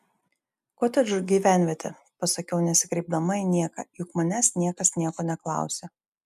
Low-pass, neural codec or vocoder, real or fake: 14.4 kHz; none; real